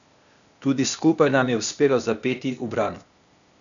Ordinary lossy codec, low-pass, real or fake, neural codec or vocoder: none; 7.2 kHz; fake; codec, 16 kHz, 0.8 kbps, ZipCodec